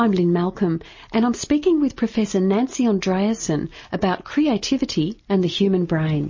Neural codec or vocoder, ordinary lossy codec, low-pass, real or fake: none; MP3, 32 kbps; 7.2 kHz; real